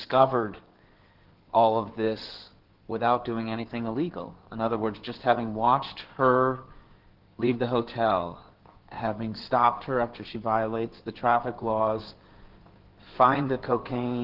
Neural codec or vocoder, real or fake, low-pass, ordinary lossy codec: codec, 16 kHz in and 24 kHz out, 2.2 kbps, FireRedTTS-2 codec; fake; 5.4 kHz; Opus, 16 kbps